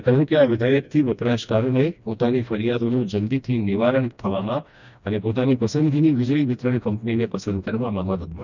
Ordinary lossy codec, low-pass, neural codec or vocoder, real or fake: none; 7.2 kHz; codec, 16 kHz, 1 kbps, FreqCodec, smaller model; fake